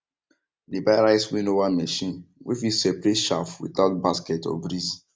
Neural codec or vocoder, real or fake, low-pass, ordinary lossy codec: none; real; none; none